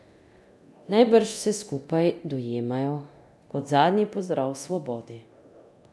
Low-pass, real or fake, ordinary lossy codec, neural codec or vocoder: none; fake; none; codec, 24 kHz, 0.9 kbps, DualCodec